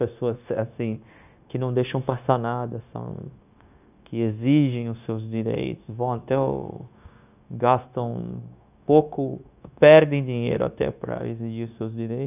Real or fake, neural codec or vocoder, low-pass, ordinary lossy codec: fake; codec, 16 kHz, 0.9 kbps, LongCat-Audio-Codec; 3.6 kHz; none